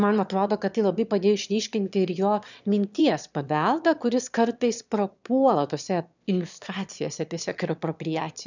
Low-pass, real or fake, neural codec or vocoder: 7.2 kHz; fake; autoencoder, 22.05 kHz, a latent of 192 numbers a frame, VITS, trained on one speaker